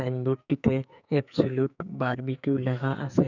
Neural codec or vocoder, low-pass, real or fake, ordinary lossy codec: codec, 44.1 kHz, 2.6 kbps, SNAC; 7.2 kHz; fake; none